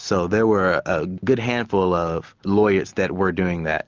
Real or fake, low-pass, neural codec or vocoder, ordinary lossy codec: real; 7.2 kHz; none; Opus, 24 kbps